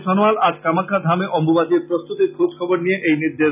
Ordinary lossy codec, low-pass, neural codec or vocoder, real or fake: none; 3.6 kHz; none; real